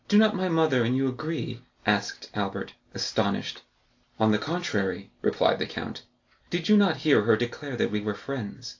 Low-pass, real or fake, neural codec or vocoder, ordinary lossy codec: 7.2 kHz; real; none; AAC, 48 kbps